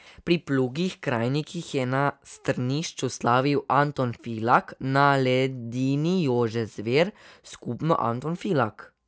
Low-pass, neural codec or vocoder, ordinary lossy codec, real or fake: none; none; none; real